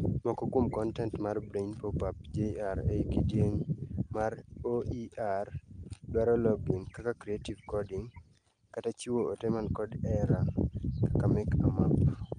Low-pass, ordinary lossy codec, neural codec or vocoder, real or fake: 9.9 kHz; Opus, 32 kbps; none; real